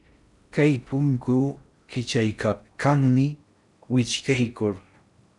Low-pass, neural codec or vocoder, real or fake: 10.8 kHz; codec, 16 kHz in and 24 kHz out, 0.6 kbps, FocalCodec, streaming, 4096 codes; fake